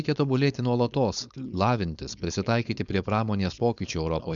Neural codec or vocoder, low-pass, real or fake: codec, 16 kHz, 4.8 kbps, FACodec; 7.2 kHz; fake